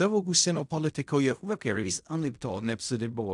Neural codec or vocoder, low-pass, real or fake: codec, 16 kHz in and 24 kHz out, 0.4 kbps, LongCat-Audio-Codec, fine tuned four codebook decoder; 10.8 kHz; fake